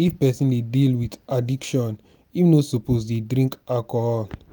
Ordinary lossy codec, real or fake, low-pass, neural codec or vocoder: none; real; none; none